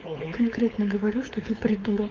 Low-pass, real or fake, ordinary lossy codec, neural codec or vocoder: 7.2 kHz; fake; Opus, 24 kbps; codec, 16 kHz, 4.8 kbps, FACodec